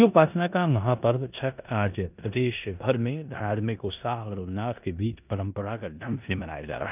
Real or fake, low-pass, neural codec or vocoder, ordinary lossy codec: fake; 3.6 kHz; codec, 16 kHz in and 24 kHz out, 0.9 kbps, LongCat-Audio-Codec, four codebook decoder; none